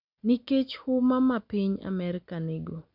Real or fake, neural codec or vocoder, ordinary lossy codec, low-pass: real; none; none; 5.4 kHz